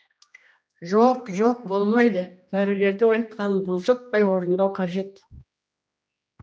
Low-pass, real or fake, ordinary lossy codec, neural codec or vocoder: none; fake; none; codec, 16 kHz, 1 kbps, X-Codec, HuBERT features, trained on general audio